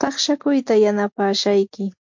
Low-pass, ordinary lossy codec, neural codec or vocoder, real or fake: 7.2 kHz; MP3, 48 kbps; none; real